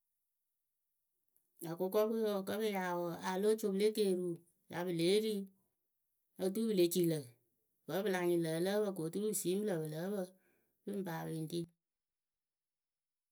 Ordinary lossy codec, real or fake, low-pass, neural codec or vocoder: none; real; none; none